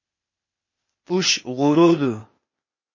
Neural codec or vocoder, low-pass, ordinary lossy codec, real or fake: codec, 16 kHz, 0.8 kbps, ZipCodec; 7.2 kHz; MP3, 32 kbps; fake